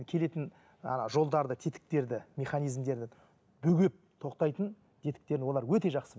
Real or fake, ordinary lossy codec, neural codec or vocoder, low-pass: real; none; none; none